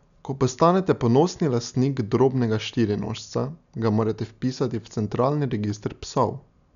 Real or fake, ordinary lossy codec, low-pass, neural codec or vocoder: real; none; 7.2 kHz; none